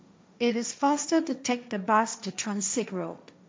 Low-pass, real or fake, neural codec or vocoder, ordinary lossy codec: none; fake; codec, 16 kHz, 1.1 kbps, Voila-Tokenizer; none